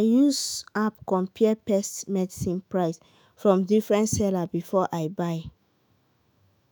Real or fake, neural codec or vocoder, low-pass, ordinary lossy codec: fake; autoencoder, 48 kHz, 128 numbers a frame, DAC-VAE, trained on Japanese speech; none; none